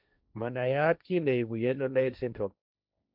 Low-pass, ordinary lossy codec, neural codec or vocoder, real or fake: 5.4 kHz; none; codec, 16 kHz, 1.1 kbps, Voila-Tokenizer; fake